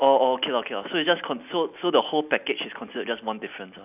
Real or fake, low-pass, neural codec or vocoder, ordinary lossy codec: real; 3.6 kHz; none; none